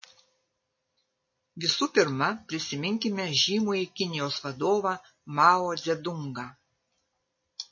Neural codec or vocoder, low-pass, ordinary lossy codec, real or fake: none; 7.2 kHz; MP3, 32 kbps; real